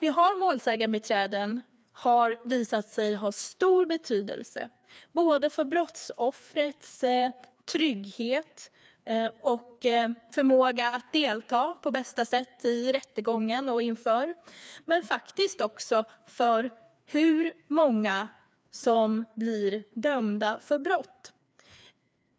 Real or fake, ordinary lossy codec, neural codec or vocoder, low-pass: fake; none; codec, 16 kHz, 2 kbps, FreqCodec, larger model; none